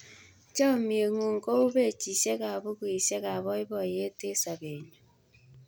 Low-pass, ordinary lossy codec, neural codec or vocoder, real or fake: none; none; none; real